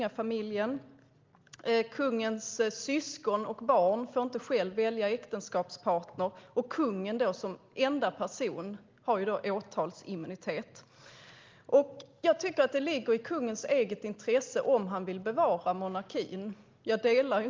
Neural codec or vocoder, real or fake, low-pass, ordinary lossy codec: none; real; 7.2 kHz; Opus, 32 kbps